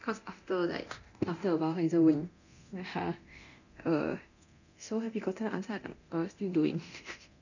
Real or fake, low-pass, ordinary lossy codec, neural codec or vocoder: fake; 7.2 kHz; none; codec, 24 kHz, 0.9 kbps, DualCodec